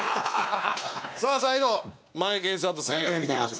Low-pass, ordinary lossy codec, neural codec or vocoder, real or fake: none; none; codec, 16 kHz, 2 kbps, X-Codec, WavLM features, trained on Multilingual LibriSpeech; fake